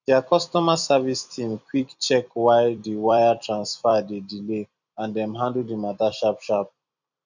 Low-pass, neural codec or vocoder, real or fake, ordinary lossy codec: 7.2 kHz; vocoder, 44.1 kHz, 128 mel bands every 256 samples, BigVGAN v2; fake; none